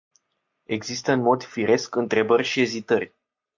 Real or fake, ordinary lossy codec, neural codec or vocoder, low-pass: real; MP3, 64 kbps; none; 7.2 kHz